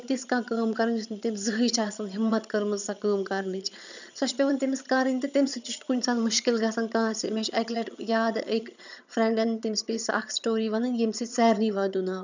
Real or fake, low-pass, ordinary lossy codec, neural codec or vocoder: fake; 7.2 kHz; none; vocoder, 22.05 kHz, 80 mel bands, HiFi-GAN